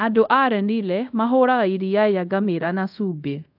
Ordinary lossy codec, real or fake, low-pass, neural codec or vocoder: AAC, 48 kbps; fake; 5.4 kHz; codec, 24 kHz, 0.5 kbps, DualCodec